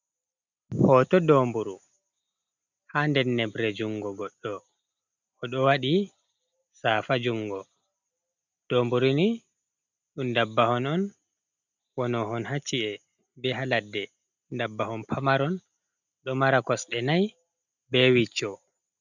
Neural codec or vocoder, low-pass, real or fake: none; 7.2 kHz; real